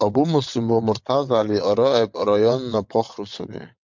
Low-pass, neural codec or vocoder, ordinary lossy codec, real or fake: 7.2 kHz; vocoder, 22.05 kHz, 80 mel bands, WaveNeXt; MP3, 64 kbps; fake